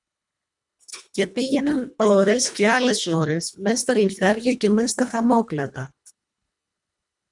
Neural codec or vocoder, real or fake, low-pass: codec, 24 kHz, 1.5 kbps, HILCodec; fake; 10.8 kHz